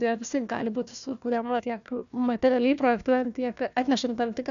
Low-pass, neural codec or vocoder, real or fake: 7.2 kHz; codec, 16 kHz, 1 kbps, FunCodec, trained on Chinese and English, 50 frames a second; fake